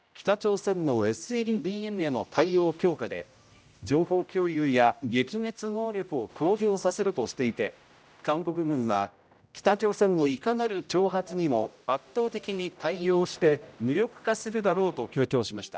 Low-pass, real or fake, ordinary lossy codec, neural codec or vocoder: none; fake; none; codec, 16 kHz, 0.5 kbps, X-Codec, HuBERT features, trained on general audio